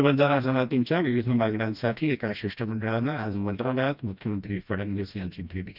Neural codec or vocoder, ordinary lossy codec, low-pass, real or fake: codec, 16 kHz, 1 kbps, FreqCodec, smaller model; none; 5.4 kHz; fake